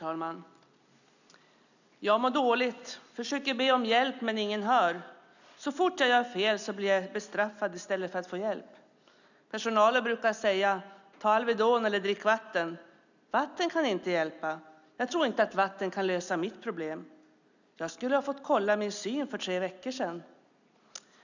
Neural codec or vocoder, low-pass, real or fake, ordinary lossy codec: none; 7.2 kHz; real; none